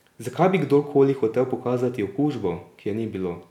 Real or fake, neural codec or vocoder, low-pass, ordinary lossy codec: real; none; 19.8 kHz; none